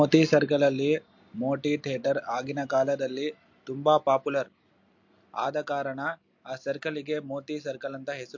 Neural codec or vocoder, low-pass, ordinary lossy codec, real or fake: none; 7.2 kHz; MP3, 48 kbps; real